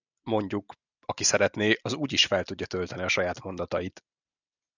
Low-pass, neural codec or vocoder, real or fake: 7.2 kHz; none; real